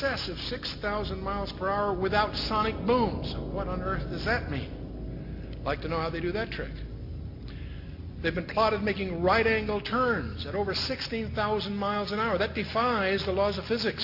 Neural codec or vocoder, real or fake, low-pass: none; real; 5.4 kHz